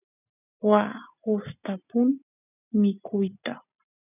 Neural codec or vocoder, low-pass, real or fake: none; 3.6 kHz; real